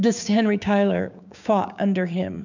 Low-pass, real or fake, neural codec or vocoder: 7.2 kHz; fake; codec, 16 kHz, 6 kbps, DAC